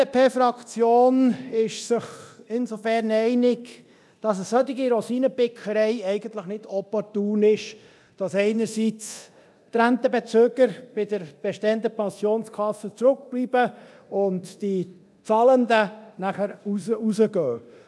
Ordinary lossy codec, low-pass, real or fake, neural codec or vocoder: none; none; fake; codec, 24 kHz, 0.9 kbps, DualCodec